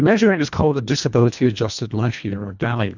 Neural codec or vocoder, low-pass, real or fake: codec, 24 kHz, 1.5 kbps, HILCodec; 7.2 kHz; fake